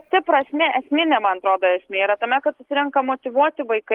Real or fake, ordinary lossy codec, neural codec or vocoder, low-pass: real; Opus, 24 kbps; none; 19.8 kHz